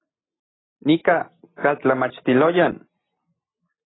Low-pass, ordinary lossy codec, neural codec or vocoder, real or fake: 7.2 kHz; AAC, 16 kbps; none; real